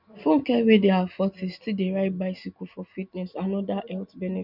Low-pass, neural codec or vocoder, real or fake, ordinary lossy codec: 5.4 kHz; none; real; none